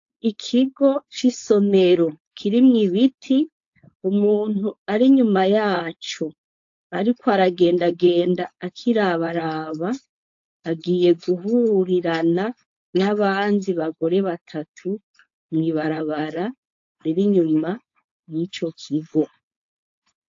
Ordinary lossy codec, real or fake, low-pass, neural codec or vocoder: AAC, 48 kbps; fake; 7.2 kHz; codec, 16 kHz, 4.8 kbps, FACodec